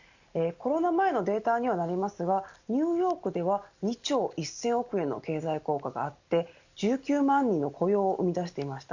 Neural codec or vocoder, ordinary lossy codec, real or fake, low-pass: none; Opus, 64 kbps; real; 7.2 kHz